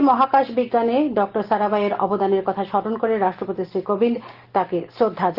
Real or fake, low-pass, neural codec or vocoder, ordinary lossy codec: real; 5.4 kHz; none; Opus, 16 kbps